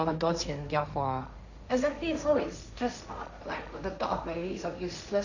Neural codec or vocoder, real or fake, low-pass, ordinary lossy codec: codec, 16 kHz, 1.1 kbps, Voila-Tokenizer; fake; 7.2 kHz; none